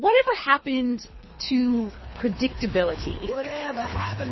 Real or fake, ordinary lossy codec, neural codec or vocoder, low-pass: fake; MP3, 24 kbps; codec, 24 kHz, 3 kbps, HILCodec; 7.2 kHz